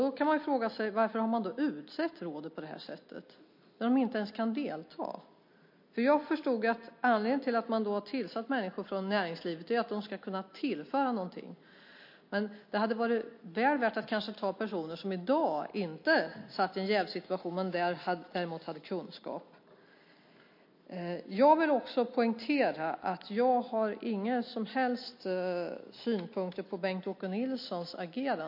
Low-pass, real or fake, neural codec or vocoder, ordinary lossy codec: 5.4 kHz; real; none; MP3, 32 kbps